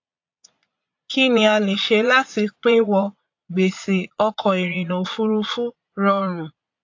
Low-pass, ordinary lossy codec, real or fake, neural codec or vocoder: 7.2 kHz; AAC, 48 kbps; fake; vocoder, 22.05 kHz, 80 mel bands, Vocos